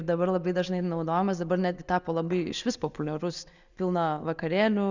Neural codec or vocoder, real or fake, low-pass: none; real; 7.2 kHz